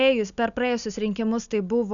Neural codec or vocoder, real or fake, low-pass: none; real; 7.2 kHz